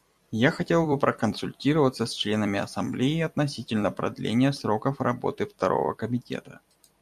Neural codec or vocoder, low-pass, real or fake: vocoder, 44.1 kHz, 128 mel bands every 256 samples, BigVGAN v2; 14.4 kHz; fake